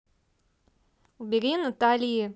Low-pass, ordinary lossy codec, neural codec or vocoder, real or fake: none; none; none; real